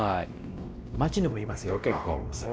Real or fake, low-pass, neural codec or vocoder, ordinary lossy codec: fake; none; codec, 16 kHz, 1 kbps, X-Codec, WavLM features, trained on Multilingual LibriSpeech; none